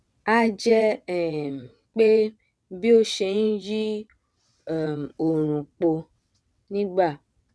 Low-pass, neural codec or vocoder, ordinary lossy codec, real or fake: none; vocoder, 22.05 kHz, 80 mel bands, WaveNeXt; none; fake